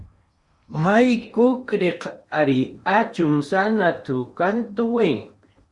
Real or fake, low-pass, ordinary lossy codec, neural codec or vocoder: fake; 10.8 kHz; Opus, 64 kbps; codec, 16 kHz in and 24 kHz out, 0.8 kbps, FocalCodec, streaming, 65536 codes